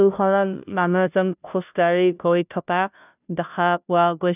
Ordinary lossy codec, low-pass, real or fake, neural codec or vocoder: none; 3.6 kHz; fake; codec, 16 kHz, 0.5 kbps, FunCodec, trained on Chinese and English, 25 frames a second